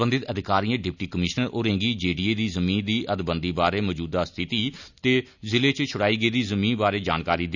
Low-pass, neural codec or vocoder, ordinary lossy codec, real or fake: 7.2 kHz; none; none; real